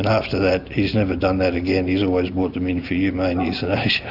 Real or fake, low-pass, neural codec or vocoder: real; 5.4 kHz; none